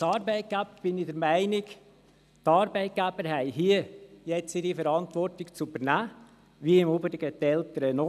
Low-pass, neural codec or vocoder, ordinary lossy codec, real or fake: 14.4 kHz; none; none; real